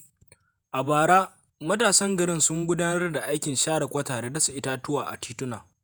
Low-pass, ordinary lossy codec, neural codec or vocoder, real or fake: none; none; vocoder, 48 kHz, 128 mel bands, Vocos; fake